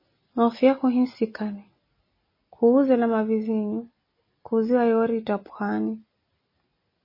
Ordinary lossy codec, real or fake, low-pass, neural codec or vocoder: MP3, 24 kbps; real; 5.4 kHz; none